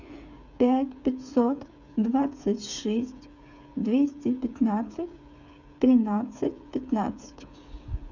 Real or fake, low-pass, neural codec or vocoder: fake; 7.2 kHz; codec, 16 kHz, 8 kbps, FreqCodec, smaller model